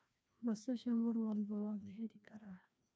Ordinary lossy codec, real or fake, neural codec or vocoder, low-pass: none; fake; codec, 16 kHz, 1 kbps, FreqCodec, larger model; none